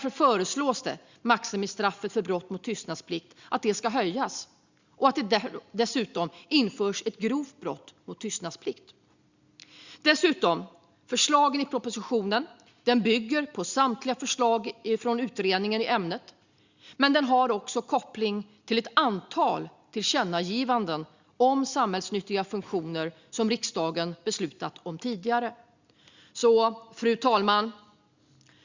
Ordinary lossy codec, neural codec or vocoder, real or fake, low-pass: Opus, 64 kbps; none; real; 7.2 kHz